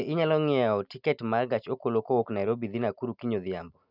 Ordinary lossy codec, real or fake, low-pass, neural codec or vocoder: none; real; 5.4 kHz; none